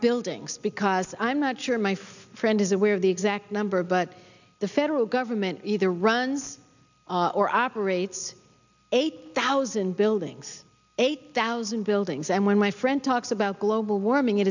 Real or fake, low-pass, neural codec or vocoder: real; 7.2 kHz; none